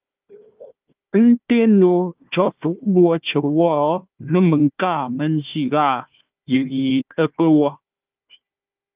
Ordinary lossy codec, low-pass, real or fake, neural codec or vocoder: Opus, 24 kbps; 3.6 kHz; fake; codec, 16 kHz, 1 kbps, FunCodec, trained on Chinese and English, 50 frames a second